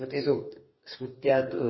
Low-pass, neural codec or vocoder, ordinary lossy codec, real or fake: 7.2 kHz; codec, 44.1 kHz, 2.6 kbps, DAC; MP3, 24 kbps; fake